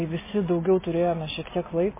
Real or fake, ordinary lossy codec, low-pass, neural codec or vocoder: real; MP3, 16 kbps; 3.6 kHz; none